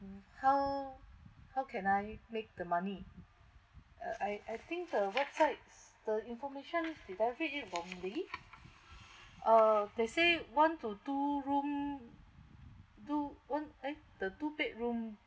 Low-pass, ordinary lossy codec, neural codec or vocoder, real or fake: none; none; none; real